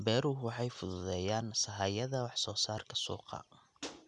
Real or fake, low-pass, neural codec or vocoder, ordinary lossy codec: real; 10.8 kHz; none; none